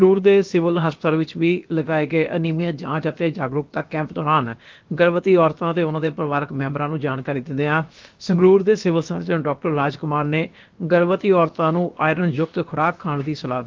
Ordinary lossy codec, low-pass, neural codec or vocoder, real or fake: Opus, 32 kbps; 7.2 kHz; codec, 16 kHz, about 1 kbps, DyCAST, with the encoder's durations; fake